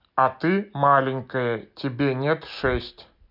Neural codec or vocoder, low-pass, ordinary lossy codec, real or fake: none; 5.4 kHz; MP3, 48 kbps; real